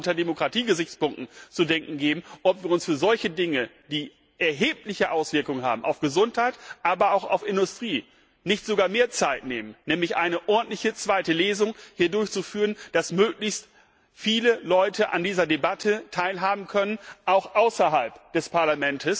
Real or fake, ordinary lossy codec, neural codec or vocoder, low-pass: real; none; none; none